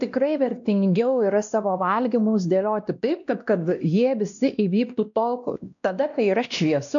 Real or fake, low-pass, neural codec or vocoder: fake; 7.2 kHz; codec, 16 kHz, 1 kbps, X-Codec, WavLM features, trained on Multilingual LibriSpeech